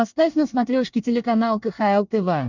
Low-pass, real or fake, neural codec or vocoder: 7.2 kHz; fake; codec, 24 kHz, 1 kbps, SNAC